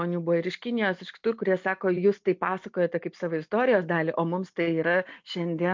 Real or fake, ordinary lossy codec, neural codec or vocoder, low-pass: real; MP3, 48 kbps; none; 7.2 kHz